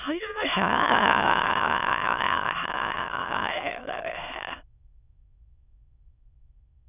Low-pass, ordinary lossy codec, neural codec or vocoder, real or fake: 3.6 kHz; none; autoencoder, 22.05 kHz, a latent of 192 numbers a frame, VITS, trained on many speakers; fake